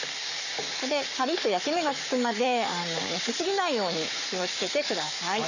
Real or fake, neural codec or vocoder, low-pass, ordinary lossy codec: fake; codec, 44.1 kHz, 7.8 kbps, Pupu-Codec; 7.2 kHz; none